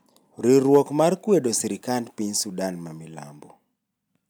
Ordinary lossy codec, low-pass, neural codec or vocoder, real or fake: none; none; none; real